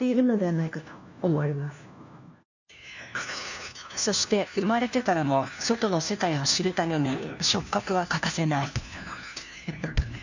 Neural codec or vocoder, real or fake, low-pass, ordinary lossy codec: codec, 16 kHz, 1 kbps, FunCodec, trained on LibriTTS, 50 frames a second; fake; 7.2 kHz; none